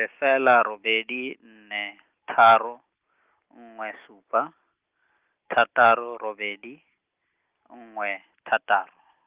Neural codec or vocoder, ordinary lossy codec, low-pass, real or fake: none; Opus, 32 kbps; 3.6 kHz; real